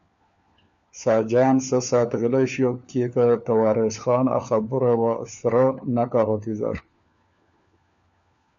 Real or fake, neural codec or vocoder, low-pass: fake; codec, 16 kHz, 4 kbps, FunCodec, trained on LibriTTS, 50 frames a second; 7.2 kHz